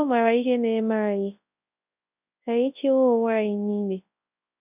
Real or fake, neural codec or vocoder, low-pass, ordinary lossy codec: fake; codec, 24 kHz, 0.9 kbps, WavTokenizer, large speech release; 3.6 kHz; none